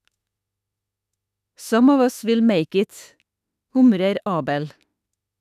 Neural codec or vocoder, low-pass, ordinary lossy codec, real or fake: autoencoder, 48 kHz, 32 numbers a frame, DAC-VAE, trained on Japanese speech; 14.4 kHz; none; fake